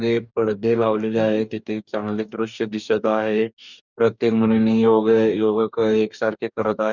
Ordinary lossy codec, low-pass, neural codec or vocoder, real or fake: none; 7.2 kHz; codec, 44.1 kHz, 2.6 kbps, DAC; fake